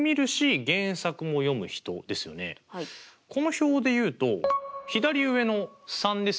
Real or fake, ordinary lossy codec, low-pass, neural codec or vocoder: real; none; none; none